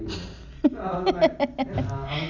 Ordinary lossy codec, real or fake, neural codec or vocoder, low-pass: none; real; none; 7.2 kHz